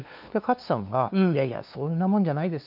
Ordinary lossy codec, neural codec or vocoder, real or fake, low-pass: none; codec, 16 kHz, 2 kbps, FunCodec, trained on LibriTTS, 25 frames a second; fake; 5.4 kHz